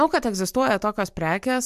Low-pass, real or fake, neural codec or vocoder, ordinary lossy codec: 14.4 kHz; real; none; MP3, 96 kbps